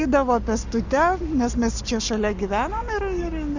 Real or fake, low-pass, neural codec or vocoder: fake; 7.2 kHz; codec, 44.1 kHz, 7.8 kbps, Pupu-Codec